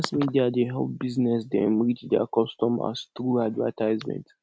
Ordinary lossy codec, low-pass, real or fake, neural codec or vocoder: none; none; real; none